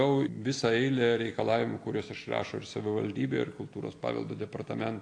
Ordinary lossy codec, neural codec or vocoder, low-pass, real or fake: AAC, 48 kbps; none; 9.9 kHz; real